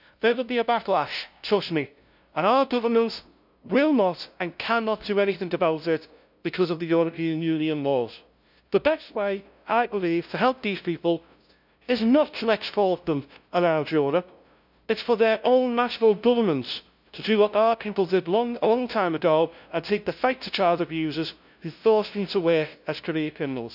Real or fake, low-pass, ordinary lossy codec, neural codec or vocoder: fake; 5.4 kHz; none; codec, 16 kHz, 0.5 kbps, FunCodec, trained on LibriTTS, 25 frames a second